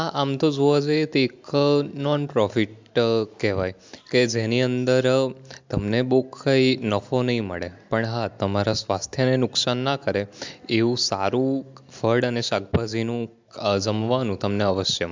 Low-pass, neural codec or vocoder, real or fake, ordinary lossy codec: 7.2 kHz; none; real; MP3, 64 kbps